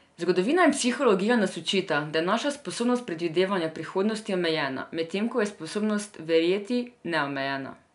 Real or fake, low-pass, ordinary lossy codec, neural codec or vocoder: real; 10.8 kHz; none; none